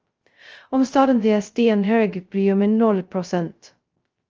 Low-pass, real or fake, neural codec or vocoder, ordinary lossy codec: 7.2 kHz; fake; codec, 16 kHz, 0.2 kbps, FocalCodec; Opus, 24 kbps